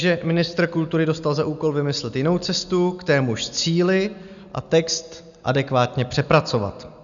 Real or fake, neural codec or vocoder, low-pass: real; none; 7.2 kHz